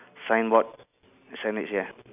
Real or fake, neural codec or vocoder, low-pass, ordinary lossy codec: real; none; 3.6 kHz; none